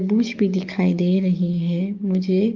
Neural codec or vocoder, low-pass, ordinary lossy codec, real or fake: codec, 44.1 kHz, 7.8 kbps, DAC; 7.2 kHz; Opus, 24 kbps; fake